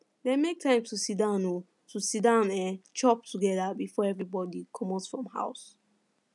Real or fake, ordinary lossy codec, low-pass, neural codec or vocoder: real; none; 10.8 kHz; none